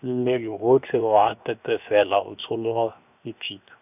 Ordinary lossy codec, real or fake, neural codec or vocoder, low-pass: none; fake; codec, 16 kHz, 0.7 kbps, FocalCodec; 3.6 kHz